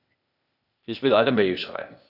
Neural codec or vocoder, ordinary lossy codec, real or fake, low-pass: codec, 16 kHz, 0.8 kbps, ZipCodec; none; fake; 5.4 kHz